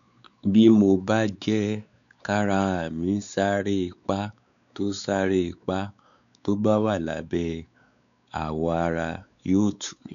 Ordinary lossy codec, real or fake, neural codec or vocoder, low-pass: none; fake; codec, 16 kHz, 4 kbps, X-Codec, WavLM features, trained on Multilingual LibriSpeech; 7.2 kHz